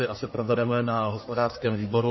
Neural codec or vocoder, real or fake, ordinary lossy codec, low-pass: codec, 44.1 kHz, 1.7 kbps, Pupu-Codec; fake; MP3, 24 kbps; 7.2 kHz